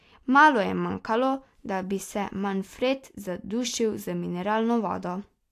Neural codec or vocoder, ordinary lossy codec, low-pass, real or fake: none; AAC, 64 kbps; 14.4 kHz; real